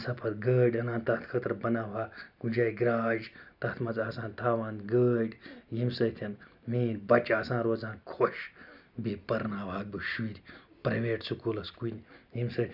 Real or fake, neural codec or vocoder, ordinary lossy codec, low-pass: real; none; none; 5.4 kHz